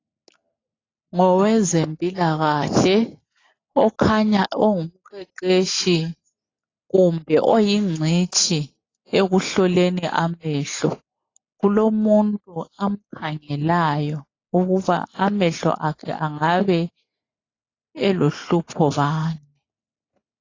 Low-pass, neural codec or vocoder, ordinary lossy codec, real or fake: 7.2 kHz; none; AAC, 32 kbps; real